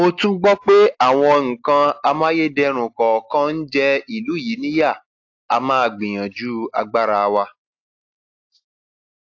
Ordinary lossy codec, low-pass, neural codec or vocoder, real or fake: AAC, 48 kbps; 7.2 kHz; none; real